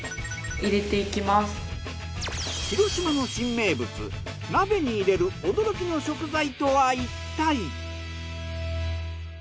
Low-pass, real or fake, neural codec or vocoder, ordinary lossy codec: none; real; none; none